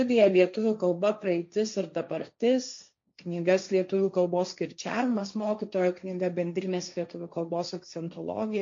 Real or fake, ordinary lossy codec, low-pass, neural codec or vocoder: fake; MP3, 48 kbps; 7.2 kHz; codec, 16 kHz, 1.1 kbps, Voila-Tokenizer